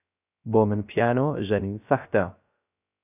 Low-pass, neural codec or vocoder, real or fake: 3.6 kHz; codec, 16 kHz, 0.3 kbps, FocalCodec; fake